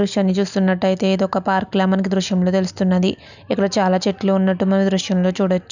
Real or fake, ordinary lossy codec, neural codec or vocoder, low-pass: real; none; none; 7.2 kHz